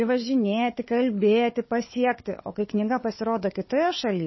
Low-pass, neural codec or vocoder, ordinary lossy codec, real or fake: 7.2 kHz; codec, 16 kHz, 4 kbps, FunCodec, trained on Chinese and English, 50 frames a second; MP3, 24 kbps; fake